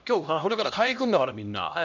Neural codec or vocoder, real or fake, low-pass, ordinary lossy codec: codec, 16 kHz, 1 kbps, X-Codec, HuBERT features, trained on LibriSpeech; fake; 7.2 kHz; none